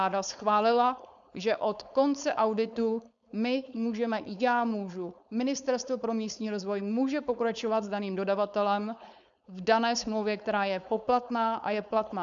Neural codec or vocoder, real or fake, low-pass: codec, 16 kHz, 4.8 kbps, FACodec; fake; 7.2 kHz